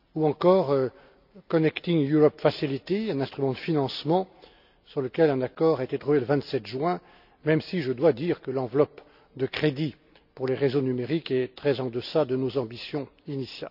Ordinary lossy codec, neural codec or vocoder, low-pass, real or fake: none; none; 5.4 kHz; real